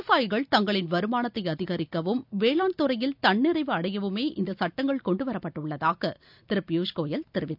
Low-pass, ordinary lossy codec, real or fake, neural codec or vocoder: 5.4 kHz; none; real; none